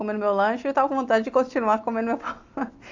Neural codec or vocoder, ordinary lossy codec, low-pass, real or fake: none; AAC, 48 kbps; 7.2 kHz; real